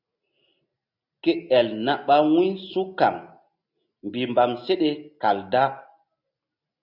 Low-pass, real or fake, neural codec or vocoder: 5.4 kHz; real; none